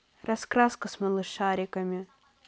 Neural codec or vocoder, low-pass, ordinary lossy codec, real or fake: none; none; none; real